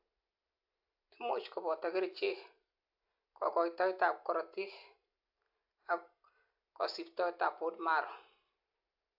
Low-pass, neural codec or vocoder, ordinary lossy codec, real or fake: 5.4 kHz; none; AAC, 48 kbps; real